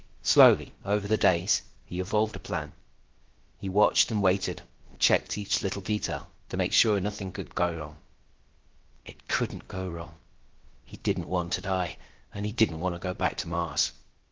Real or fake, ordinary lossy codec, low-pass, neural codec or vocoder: fake; Opus, 16 kbps; 7.2 kHz; codec, 16 kHz, about 1 kbps, DyCAST, with the encoder's durations